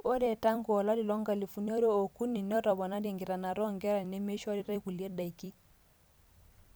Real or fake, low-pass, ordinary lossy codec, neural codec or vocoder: fake; none; none; vocoder, 44.1 kHz, 128 mel bands every 256 samples, BigVGAN v2